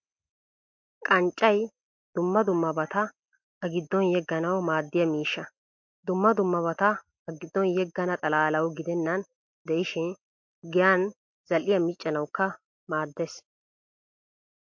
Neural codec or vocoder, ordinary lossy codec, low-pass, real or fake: none; MP3, 48 kbps; 7.2 kHz; real